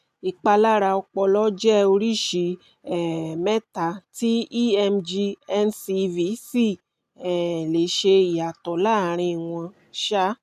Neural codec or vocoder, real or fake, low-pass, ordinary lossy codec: none; real; 14.4 kHz; none